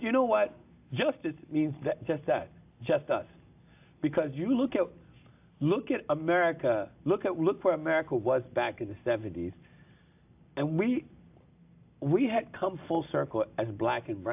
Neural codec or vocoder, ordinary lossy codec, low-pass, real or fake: none; AAC, 32 kbps; 3.6 kHz; real